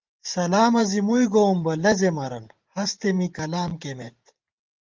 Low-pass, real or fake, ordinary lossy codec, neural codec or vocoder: 7.2 kHz; fake; Opus, 24 kbps; vocoder, 44.1 kHz, 128 mel bands every 512 samples, BigVGAN v2